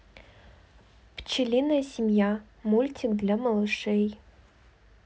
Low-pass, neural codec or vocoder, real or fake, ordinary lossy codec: none; none; real; none